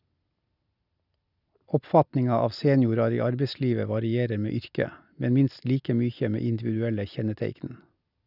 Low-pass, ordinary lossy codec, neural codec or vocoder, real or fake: 5.4 kHz; none; none; real